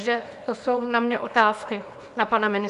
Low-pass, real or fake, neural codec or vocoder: 10.8 kHz; fake; codec, 24 kHz, 0.9 kbps, WavTokenizer, small release